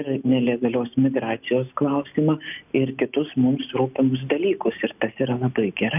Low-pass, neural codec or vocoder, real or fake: 3.6 kHz; none; real